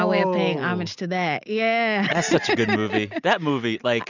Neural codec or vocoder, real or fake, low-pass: none; real; 7.2 kHz